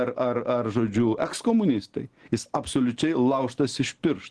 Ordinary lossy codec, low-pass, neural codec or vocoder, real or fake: Opus, 16 kbps; 10.8 kHz; none; real